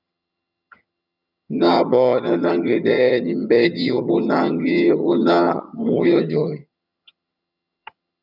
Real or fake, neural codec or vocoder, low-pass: fake; vocoder, 22.05 kHz, 80 mel bands, HiFi-GAN; 5.4 kHz